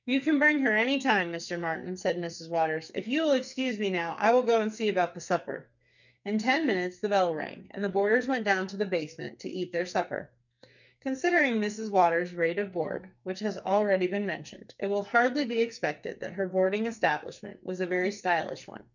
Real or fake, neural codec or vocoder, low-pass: fake; codec, 44.1 kHz, 2.6 kbps, SNAC; 7.2 kHz